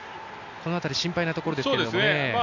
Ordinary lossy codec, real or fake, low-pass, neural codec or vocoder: none; real; 7.2 kHz; none